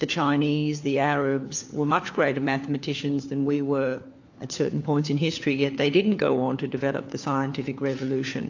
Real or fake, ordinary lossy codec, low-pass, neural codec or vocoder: fake; AAC, 48 kbps; 7.2 kHz; codec, 16 kHz, 4 kbps, FunCodec, trained on LibriTTS, 50 frames a second